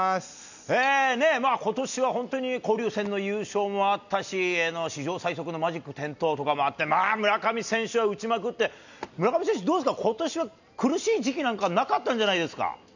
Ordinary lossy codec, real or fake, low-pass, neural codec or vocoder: none; real; 7.2 kHz; none